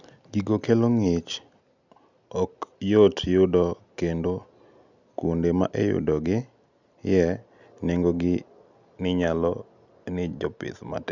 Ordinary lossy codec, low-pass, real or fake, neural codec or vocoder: none; 7.2 kHz; real; none